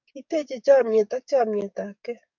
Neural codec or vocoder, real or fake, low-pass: codec, 44.1 kHz, 7.8 kbps, DAC; fake; 7.2 kHz